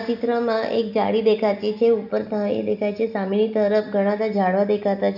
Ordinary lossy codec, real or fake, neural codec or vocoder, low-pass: none; real; none; 5.4 kHz